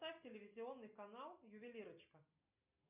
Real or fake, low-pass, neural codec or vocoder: real; 3.6 kHz; none